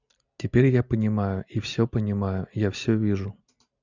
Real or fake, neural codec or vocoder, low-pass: real; none; 7.2 kHz